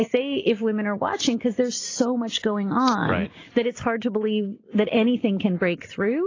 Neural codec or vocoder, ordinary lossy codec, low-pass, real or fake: none; AAC, 32 kbps; 7.2 kHz; real